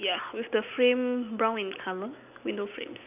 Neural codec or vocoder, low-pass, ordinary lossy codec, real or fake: none; 3.6 kHz; none; real